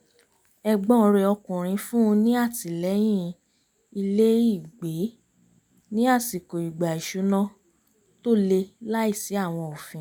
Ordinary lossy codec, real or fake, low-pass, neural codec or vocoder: none; real; none; none